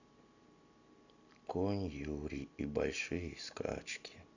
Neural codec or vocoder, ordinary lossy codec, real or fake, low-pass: none; none; real; 7.2 kHz